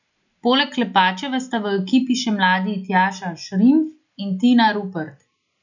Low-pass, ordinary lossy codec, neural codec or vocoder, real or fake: 7.2 kHz; none; none; real